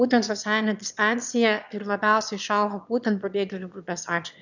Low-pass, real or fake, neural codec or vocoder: 7.2 kHz; fake; autoencoder, 22.05 kHz, a latent of 192 numbers a frame, VITS, trained on one speaker